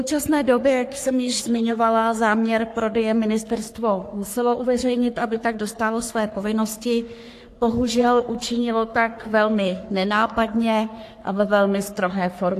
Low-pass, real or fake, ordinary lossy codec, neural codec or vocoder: 14.4 kHz; fake; AAC, 64 kbps; codec, 44.1 kHz, 3.4 kbps, Pupu-Codec